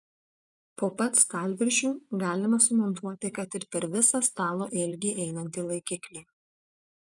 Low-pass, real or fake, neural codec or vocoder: 10.8 kHz; fake; vocoder, 24 kHz, 100 mel bands, Vocos